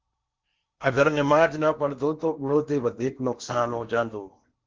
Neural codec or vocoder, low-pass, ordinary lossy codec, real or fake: codec, 16 kHz in and 24 kHz out, 0.8 kbps, FocalCodec, streaming, 65536 codes; 7.2 kHz; Opus, 32 kbps; fake